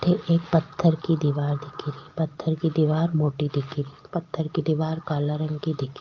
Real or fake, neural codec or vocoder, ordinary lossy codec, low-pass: real; none; Opus, 24 kbps; 7.2 kHz